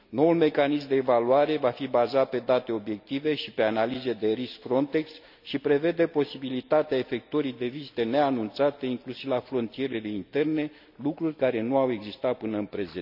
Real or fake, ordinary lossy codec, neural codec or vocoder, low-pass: real; none; none; 5.4 kHz